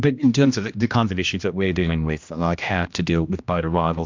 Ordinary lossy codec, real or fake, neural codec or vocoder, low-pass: MP3, 64 kbps; fake; codec, 16 kHz, 1 kbps, X-Codec, HuBERT features, trained on general audio; 7.2 kHz